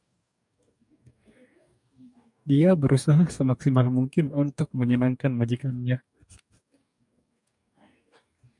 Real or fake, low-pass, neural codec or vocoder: fake; 10.8 kHz; codec, 44.1 kHz, 2.6 kbps, DAC